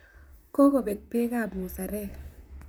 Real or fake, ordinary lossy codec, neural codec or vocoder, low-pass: fake; none; vocoder, 44.1 kHz, 128 mel bands, Pupu-Vocoder; none